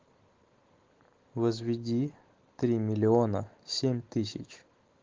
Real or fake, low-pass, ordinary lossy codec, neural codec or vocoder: real; 7.2 kHz; Opus, 16 kbps; none